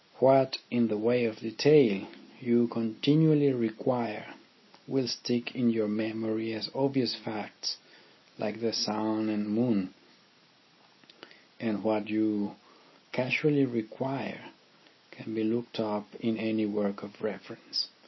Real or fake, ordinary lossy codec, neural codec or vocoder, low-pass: real; MP3, 24 kbps; none; 7.2 kHz